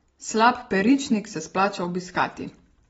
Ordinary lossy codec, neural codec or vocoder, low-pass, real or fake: AAC, 24 kbps; none; 19.8 kHz; real